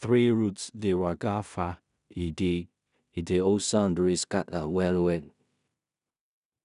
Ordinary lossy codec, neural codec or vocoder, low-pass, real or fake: none; codec, 16 kHz in and 24 kHz out, 0.4 kbps, LongCat-Audio-Codec, two codebook decoder; 10.8 kHz; fake